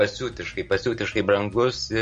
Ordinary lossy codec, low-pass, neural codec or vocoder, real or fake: MP3, 48 kbps; 9.9 kHz; none; real